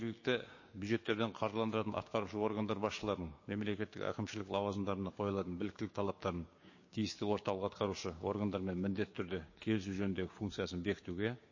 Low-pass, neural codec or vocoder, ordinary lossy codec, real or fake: 7.2 kHz; codec, 16 kHz, 6 kbps, DAC; MP3, 32 kbps; fake